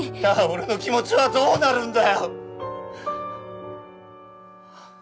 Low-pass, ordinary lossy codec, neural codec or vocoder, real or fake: none; none; none; real